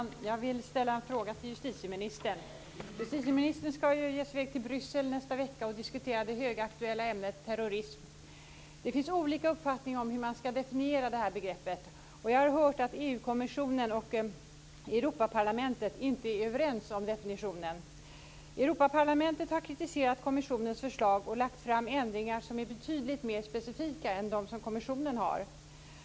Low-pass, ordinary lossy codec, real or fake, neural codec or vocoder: none; none; real; none